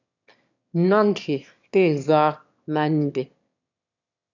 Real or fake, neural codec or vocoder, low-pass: fake; autoencoder, 22.05 kHz, a latent of 192 numbers a frame, VITS, trained on one speaker; 7.2 kHz